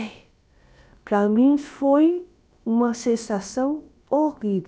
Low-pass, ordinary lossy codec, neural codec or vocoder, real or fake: none; none; codec, 16 kHz, about 1 kbps, DyCAST, with the encoder's durations; fake